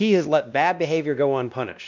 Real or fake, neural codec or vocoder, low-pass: fake; codec, 16 kHz, 1 kbps, X-Codec, WavLM features, trained on Multilingual LibriSpeech; 7.2 kHz